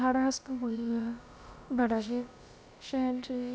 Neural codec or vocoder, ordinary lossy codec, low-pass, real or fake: codec, 16 kHz, about 1 kbps, DyCAST, with the encoder's durations; none; none; fake